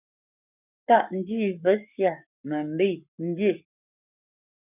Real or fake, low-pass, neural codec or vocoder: fake; 3.6 kHz; codec, 44.1 kHz, 7.8 kbps, Pupu-Codec